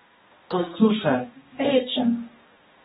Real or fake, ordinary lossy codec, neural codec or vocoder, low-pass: fake; AAC, 16 kbps; codec, 16 kHz, 1 kbps, X-Codec, HuBERT features, trained on balanced general audio; 7.2 kHz